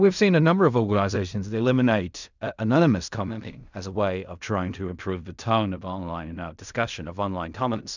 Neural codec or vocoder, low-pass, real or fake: codec, 16 kHz in and 24 kHz out, 0.4 kbps, LongCat-Audio-Codec, fine tuned four codebook decoder; 7.2 kHz; fake